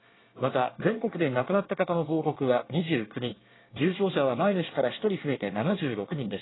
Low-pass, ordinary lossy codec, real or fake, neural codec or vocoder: 7.2 kHz; AAC, 16 kbps; fake; codec, 24 kHz, 1 kbps, SNAC